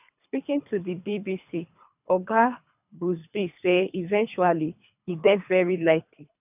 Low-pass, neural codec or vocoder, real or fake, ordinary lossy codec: 3.6 kHz; codec, 24 kHz, 3 kbps, HILCodec; fake; none